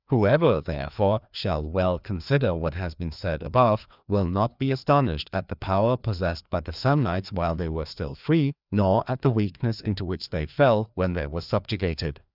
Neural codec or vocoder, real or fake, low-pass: codec, 16 kHz, 2 kbps, FreqCodec, larger model; fake; 5.4 kHz